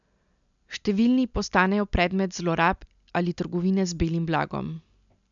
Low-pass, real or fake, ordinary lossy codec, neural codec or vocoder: 7.2 kHz; real; MP3, 96 kbps; none